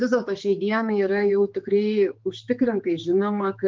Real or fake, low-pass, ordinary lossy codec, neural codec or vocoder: fake; 7.2 kHz; Opus, 32 kbps; codec, 16 kHz, 4 kbps, X-Codec, HuBERT features, trained on general audio